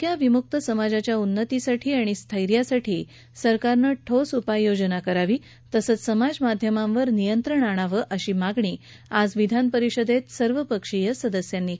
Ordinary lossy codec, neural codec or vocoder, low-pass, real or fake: none; none; none; real